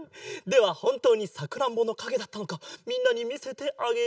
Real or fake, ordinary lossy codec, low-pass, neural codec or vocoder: real; none; none; none